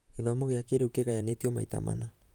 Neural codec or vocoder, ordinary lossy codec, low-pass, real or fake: vocoder, 44.1 kHz, 128 mel bands, Pupu-Vocoder; Opus, 32 kbps; 19.8 kHz; fake